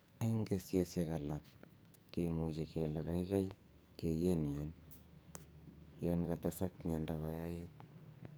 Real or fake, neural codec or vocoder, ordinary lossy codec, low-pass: fake; codec, 44.1 kHz, 2.6 kbps, SNAC; none; none